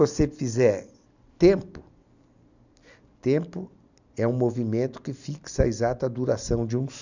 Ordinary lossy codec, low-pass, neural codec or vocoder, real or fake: none; 7.2 kHz; none; real